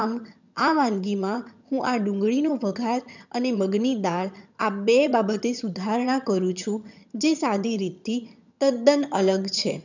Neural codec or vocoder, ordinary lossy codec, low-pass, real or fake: vocoder, 22.05 kHz, 80 mel bands, HiFi-GAN; none; 7.2 kHz; fake